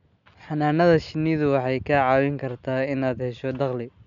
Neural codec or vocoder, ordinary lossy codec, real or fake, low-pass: none; none; real; 7.2 kHz